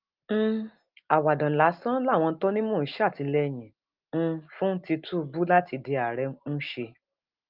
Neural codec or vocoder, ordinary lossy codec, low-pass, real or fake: none; Opus, 32 kbps; 5.4 kHz; real